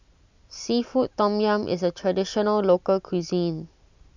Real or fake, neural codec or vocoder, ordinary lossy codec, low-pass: real; none; none; 7.2 kHz